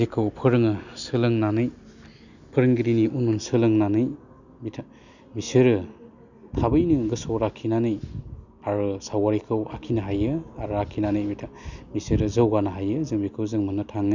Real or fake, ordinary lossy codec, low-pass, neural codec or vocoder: real; none; 7.2 kHz; none